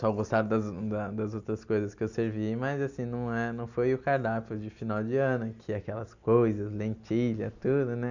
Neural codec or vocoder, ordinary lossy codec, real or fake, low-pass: none; none; real; 7.2 kHz